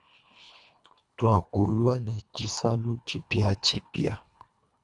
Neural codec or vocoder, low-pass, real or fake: codec, 24 kHz, 1.5 kbps, HILCodec; 10.8 kHz; fake